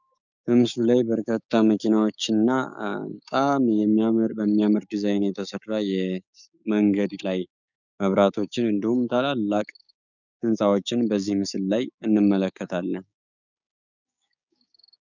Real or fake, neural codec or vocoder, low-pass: fake; codec, 16 kHz, 6 kbps, DAC; 7.2 kHz